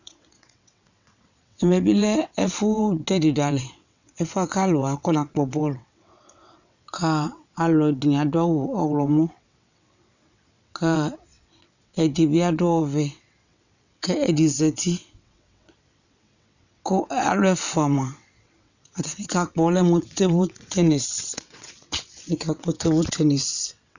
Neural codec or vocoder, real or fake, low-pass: vocoder, 22.05 kHz, 80 mel bands, WaveNeXt; fake; 7.2 kHz